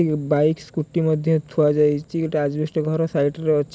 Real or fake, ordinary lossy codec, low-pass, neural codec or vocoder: real; none; none; none